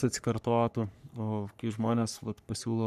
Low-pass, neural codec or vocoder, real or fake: 14.4 kHz; codec, 44.1 kHz, 3.4 kbps, Pupu-Codec; fake